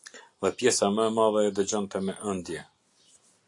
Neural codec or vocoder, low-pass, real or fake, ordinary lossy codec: none; 10.8 kHz; real; AAC, 64 kbps